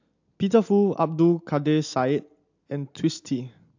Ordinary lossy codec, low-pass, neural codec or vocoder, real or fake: AAC, 48 kbps; 7.2 kHz; none; real